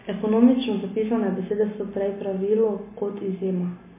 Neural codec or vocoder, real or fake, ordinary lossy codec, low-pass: none; real; MP3, 16 kbps; 3.6 kHz